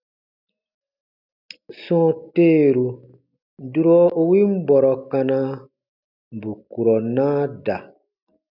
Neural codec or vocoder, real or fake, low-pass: none; real; 5.4 kHz